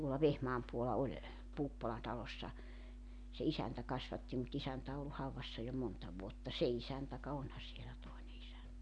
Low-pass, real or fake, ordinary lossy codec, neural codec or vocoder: 9.9 kHz; real; none; none